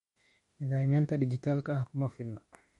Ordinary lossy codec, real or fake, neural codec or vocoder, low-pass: MP3, 48 kbps; fake; autoencoder, 48 kHz, 32 numbers a frame, DAC-VAE, trained on Japanese speech; 19.8 kHz